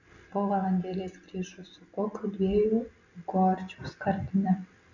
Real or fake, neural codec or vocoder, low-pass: real; none; 7.2 kHz